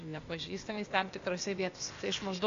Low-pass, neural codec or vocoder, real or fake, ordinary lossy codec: 7.2 kHz; codec, 16 kHz, 0.8 kbps, ZipCodec; fake; AAC, 48 kbps